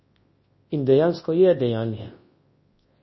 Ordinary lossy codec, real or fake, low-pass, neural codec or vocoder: MP3, 24 kbps; fake; 7.2 kHz; codec, 24 kHz, 0.9 kbps, WavTokenizer, large speech release